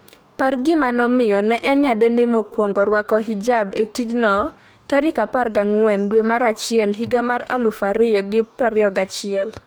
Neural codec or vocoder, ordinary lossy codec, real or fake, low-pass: codec, 44.1 kHz, 2.6 kbps, DAC; none; fake; none